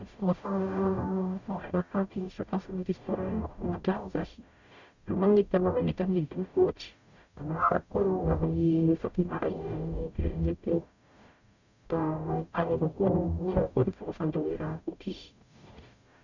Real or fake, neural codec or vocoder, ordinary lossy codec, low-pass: fake; codec, 44.1 kHz, 0.9 kbps, DAC; none; 7.2 kHz